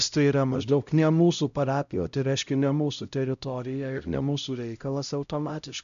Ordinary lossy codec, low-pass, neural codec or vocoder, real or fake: AAC, 96 kbps; 7.2 kHz; codec, 16 kHz, 0.5 kbps, X-Codec, HuBERT features, trained on LibriSpeech; fake